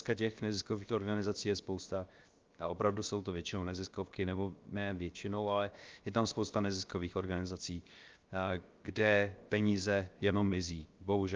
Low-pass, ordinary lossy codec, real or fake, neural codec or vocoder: 7.2 kHz; Opus, 24 kbps; fake; codec, 16 kHz, 0.7 kbps, FocalCodec